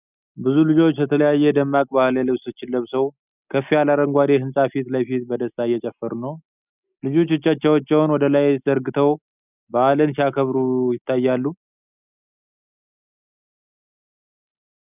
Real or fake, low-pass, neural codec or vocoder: real; 3.6 kHz; none